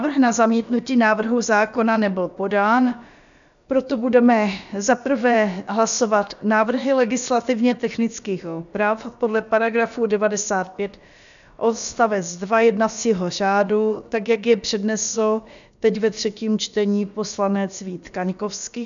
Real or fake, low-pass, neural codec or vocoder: fake; 7.2 kHz; codec, 16 kHz, about 1 kbps, DyCAST, with the encoder's durations